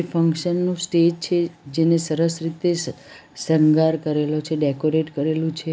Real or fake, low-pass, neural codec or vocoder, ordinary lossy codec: real; none; none; none